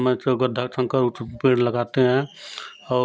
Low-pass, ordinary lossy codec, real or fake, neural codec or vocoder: none; none; real; none